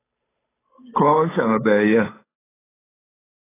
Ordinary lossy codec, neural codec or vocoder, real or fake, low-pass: AAC, 16 kbps; codec, 16 kHz, 8 kbps, FunCodec, trained on Chinese and English, 25 frames a second; fake; 3.6 kHz